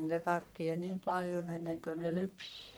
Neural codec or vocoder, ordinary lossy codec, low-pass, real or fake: codec, 44.1 kHz, 1.7 kbps, Pupu-Codec; none; none; fake